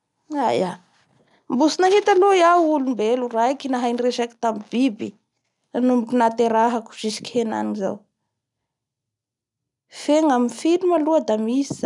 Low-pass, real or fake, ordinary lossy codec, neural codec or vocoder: 10.8 kHz; real; none; none